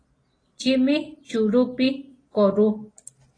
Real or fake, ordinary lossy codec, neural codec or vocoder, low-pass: real; AAC, 32 kbps; none; 9.9 kHz